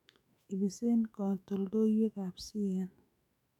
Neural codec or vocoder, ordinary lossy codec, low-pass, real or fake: autoencoder, 48 kHz, 128 numbers a frame, DAC-VAE, trained on Japanese speech; none; 19.8 kHz; fake